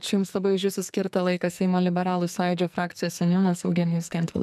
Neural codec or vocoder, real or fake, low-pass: codec, 44.1 kHz, 2.6 kbps, SNAC; fake; 14.4 kHz